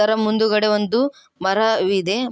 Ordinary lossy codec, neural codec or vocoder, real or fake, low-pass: none; none; real; none